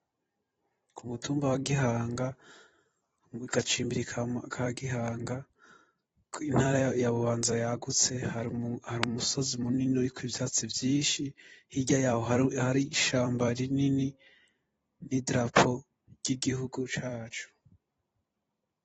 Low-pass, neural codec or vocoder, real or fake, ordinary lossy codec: 19.8 kHz; vocoder, 44.1 kHz, 128 mel bands every 256 samples, BigVGAN v2; fake; AAC, 24 kbps